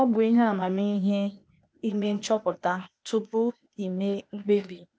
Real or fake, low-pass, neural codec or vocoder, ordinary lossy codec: fake; none; codec, 16 kHz, 0.8 kbps, ZipCodec; none